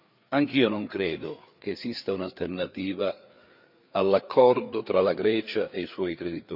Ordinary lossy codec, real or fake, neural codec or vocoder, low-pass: none; fake; codec, 16 kHz, 4 kbps, FreqCodec, larger model; 5.4 kHz